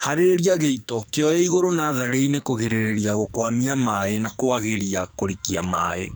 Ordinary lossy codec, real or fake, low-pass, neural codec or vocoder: none; fake; none; codec, 44.1 kHz, 2.6 kbps, SNAC